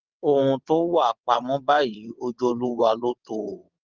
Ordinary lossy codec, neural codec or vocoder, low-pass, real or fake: Opus, 24 kbps; vocoder, 22.05 kHz, 80 mel bands, WaveNeXt; 7.2 kHz; fake